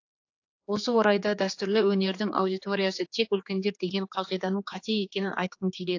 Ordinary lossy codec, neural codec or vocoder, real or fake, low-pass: AAC, 48 kbps; codec, 16 kHz, 4 kbps, X-Codec, HuBERT features, trained on general audio; fake; 7.2 kHz